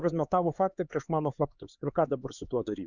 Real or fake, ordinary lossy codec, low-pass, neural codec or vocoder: fake; Opus, 64 kbps; 7.2 kHz; codec, 16 kHz, 4 kbps, X-Codec, HuBERT features, trained on LibriSpeech